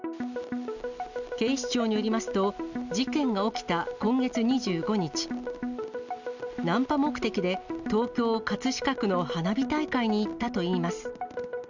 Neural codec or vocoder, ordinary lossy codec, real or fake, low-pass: none; none; real; 7.2 kHz